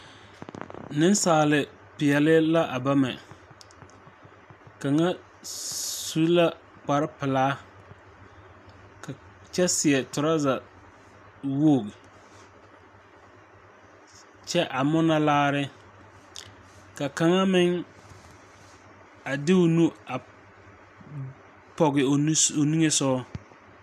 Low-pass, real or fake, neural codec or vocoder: 14.4 kHz; real; none